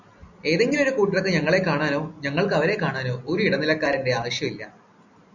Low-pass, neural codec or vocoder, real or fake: 7.2 kHz; none; real